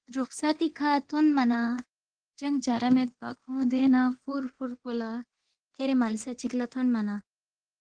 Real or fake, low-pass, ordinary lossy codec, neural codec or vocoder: fake; 9.9 kHz; Opus, 16 kbps; codec, 24 kHz, 0.9 kbps, DualCodec